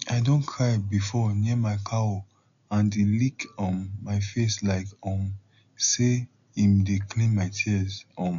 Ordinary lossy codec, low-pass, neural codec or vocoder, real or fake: none; 7.2 kHz; none; real